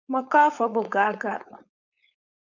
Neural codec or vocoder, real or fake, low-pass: codec, 16 kHz, 4.8 kbps, FACodec; fake; 7.2 kHz